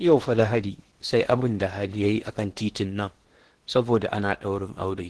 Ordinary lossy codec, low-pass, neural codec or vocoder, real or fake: Opus, 16 kbps; 10.8 kHz; codec, 16 kHz in and 24 kHz out, 0.8 kbps, FocalCodec, streaming, 65536 codes; fake